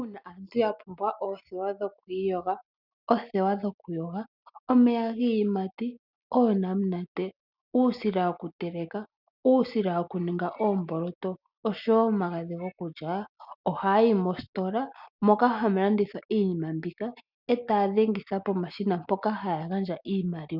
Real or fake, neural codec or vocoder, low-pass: real; none; 5.4 kHz